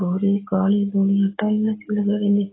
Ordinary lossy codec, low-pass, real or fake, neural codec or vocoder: AAC, 16 kbps; 7.2 kHz; real; none